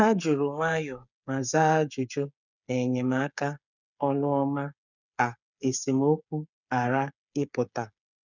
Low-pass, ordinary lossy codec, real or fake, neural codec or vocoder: 7.2 kHz; none; fake; codec, 16 kHz, 8 kbps, FreqCodec, smaller model